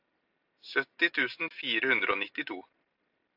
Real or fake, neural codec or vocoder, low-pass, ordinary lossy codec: real; none; 5.4 kHz; AAC, 48 kbps